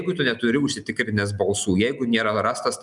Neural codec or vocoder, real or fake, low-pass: none; real; 10.8 kHz